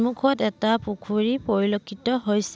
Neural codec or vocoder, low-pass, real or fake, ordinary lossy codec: none; none; real; none